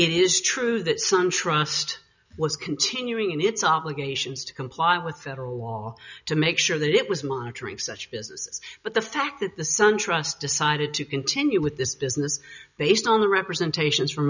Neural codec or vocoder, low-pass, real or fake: none; 7.2 kHz; real